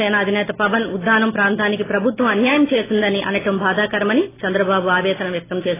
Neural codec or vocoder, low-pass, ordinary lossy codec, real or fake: none; 3.6 kHz; AAC, 16 kbps; real